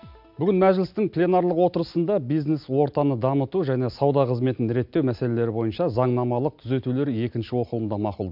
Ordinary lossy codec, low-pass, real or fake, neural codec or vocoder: MP3, 48 kbps; 5.4 kHz; real; none